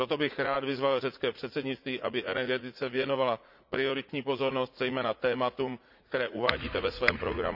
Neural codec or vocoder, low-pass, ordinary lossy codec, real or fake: vocoder, 44.1 kHz, 80 mel bands, Vocos; 5.4 kHz; none; fake